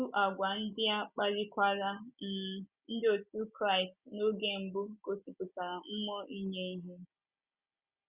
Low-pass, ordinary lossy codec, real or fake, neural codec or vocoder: 3.6 kHz; Opus, 64 kbps; real; none